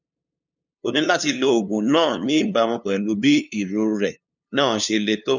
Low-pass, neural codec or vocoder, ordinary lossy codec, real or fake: 7.2 kHz; codec, 16 kHz, 2 kbps, FunCodec, trained on LibriTTS, 25 frames a second; none; fake